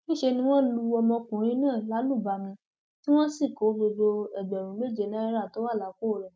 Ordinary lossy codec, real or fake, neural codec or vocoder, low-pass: none; real; none; none